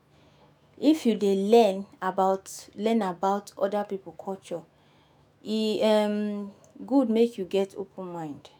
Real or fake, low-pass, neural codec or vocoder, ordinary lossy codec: fake; none; autoencoder, 48 kHz, 128 numbers a frame, DAC-VAE, trained on Japanese speech; none